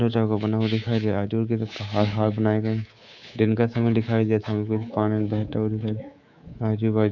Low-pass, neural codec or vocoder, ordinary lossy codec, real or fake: 7.2 kHz; codec, 24 kHz, 3.1 kbps, DualCodec; none; fake